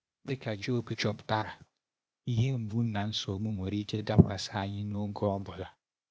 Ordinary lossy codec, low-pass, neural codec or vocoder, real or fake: none; none; codec, 16 kHz, 0.8 kbps, ZipCodec; fake